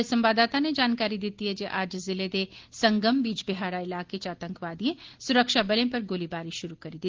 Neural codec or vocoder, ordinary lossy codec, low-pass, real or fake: none; Opus, 16 kbps; 7.2 kHz; real